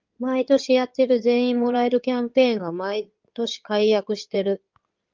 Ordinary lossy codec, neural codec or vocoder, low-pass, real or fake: Opus, 32 kbps; codec, 16 kHz in and 24 kHz out, 2.2 kbps, FireRedTTS-2 codec; 7.2 kHz; fake